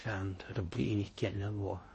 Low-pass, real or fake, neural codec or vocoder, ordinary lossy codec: 10.8 kHz; fake; codec, 16 kHz in and 24 kHz out, 0.6 kbps, FocalCodec, streaming, 4096 codes; MP3, 32 kbps